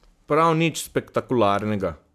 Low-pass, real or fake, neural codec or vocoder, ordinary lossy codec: 14.4 kHz; real; none; MP3, 96 kbps